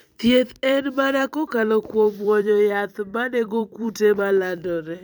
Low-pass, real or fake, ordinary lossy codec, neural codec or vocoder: none; fake; none; vocoder, 44.1 kHz, 128 mel bands every 256 samples, BigVGAN v2